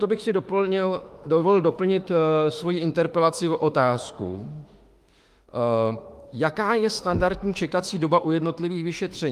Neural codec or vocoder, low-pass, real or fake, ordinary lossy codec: autoencoder, 48 kHz, 32 numbers a frame, DAC-VAE, trained on Japanese speech; 14.4 kHz; fake; Opus, 32 kbps